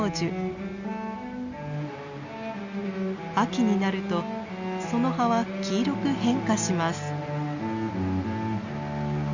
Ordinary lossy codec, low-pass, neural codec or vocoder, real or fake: Opus, 64 kbps; 7.2 kHz; none; real